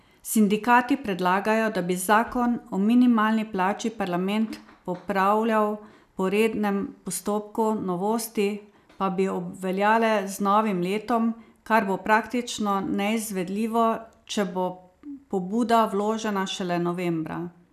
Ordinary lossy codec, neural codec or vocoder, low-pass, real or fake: none; none; 14.4 kHz; real